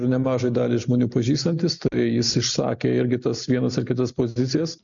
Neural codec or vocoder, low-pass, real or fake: none; 7.2 kHz; real